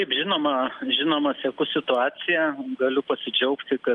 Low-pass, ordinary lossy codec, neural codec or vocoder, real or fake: 10.8 kHz; Opus, 32 kbps; none; real